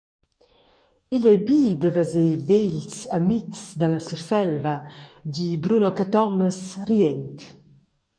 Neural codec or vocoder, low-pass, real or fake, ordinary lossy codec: codec, 44.1 kHz, 2.6 kbps, DAC; 9.9 kHz; fake; MP3, 64 kbps